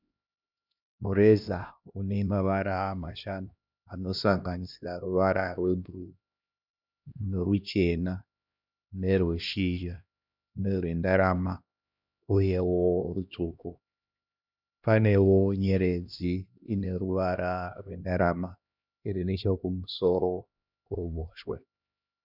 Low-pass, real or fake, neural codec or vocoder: 5.4 kHz; fake; codec, 16 kHz, 1 kbps, X-Codec, HuBERT features, trained on LibriSpeech